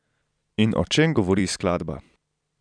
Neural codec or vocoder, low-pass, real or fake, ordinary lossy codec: vocoder, 44.1 kHz, 128 mel bands every 256 samples, BigVGAN v2; 9.9 kHz; fake; none